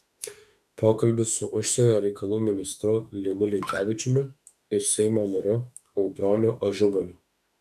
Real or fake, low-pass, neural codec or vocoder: fake; 14.4 kHz; autoencoder, 48 kHz, 32 numbers a frame, DAC-VAE, trained on Japanese speech